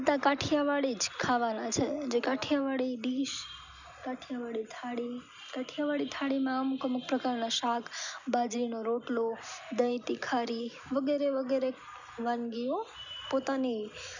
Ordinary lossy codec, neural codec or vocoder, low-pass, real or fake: none; none; 7.2 kHz; real